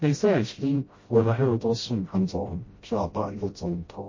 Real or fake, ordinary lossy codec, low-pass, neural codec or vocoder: fake; MP3, 32 kbps; 7.2 kHz; codec, 16 kHz, 0.5 kbps, FreqCodec, smaller model